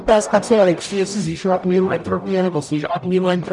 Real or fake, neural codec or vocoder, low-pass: fake; codec, 44.1 kHz, 0.9 kbps, DAC; 10.8 kHz